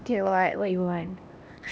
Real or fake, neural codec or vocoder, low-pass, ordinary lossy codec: fake; codec, 16 kHz, 1 kbps, X-Codec, HuBERT features, trained on LibriSpeech; none; none